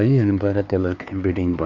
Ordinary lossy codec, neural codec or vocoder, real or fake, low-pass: none; codec, 16 kHz, 2 kbps, X-Codec, HuBERT features, trained on LibriSpeech; fake; 7.2 kHz